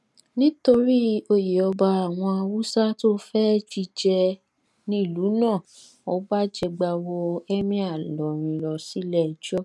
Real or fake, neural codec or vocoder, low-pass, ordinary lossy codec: real; none; none; none